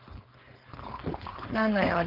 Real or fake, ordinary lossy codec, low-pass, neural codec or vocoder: fake; Opus, 16 kbps; 5.4 kHz; codec, 16 kHz, 4.8 kbps, FACodec